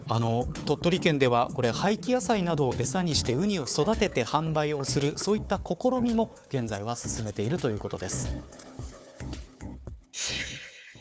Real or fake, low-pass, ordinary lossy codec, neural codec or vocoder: fake; none; none; codec, 16 kHz, 4 kbps, FunCodec, trained on Chinese and English, 50 frames a second